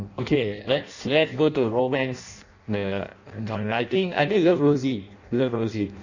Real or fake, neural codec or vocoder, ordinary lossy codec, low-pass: fake; codec, 16 kHz in and 24 kHz out, 0.6 kbps, FireRedTTS-2 codec; none; 7.2 kHz